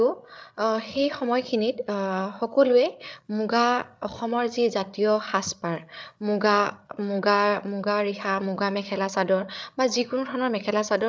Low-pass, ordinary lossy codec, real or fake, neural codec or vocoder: none; none; fake; codec, 16 kHz, 8 kbps, FreqCodec, larger model